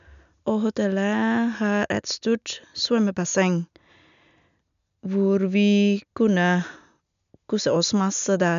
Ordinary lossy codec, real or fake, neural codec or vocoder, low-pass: none; real; none; 7.2 kHz